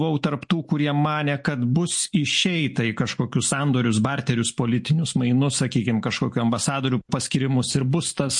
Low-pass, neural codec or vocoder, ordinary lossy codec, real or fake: 10.8 kHz; none; MP3, 48 kbps; real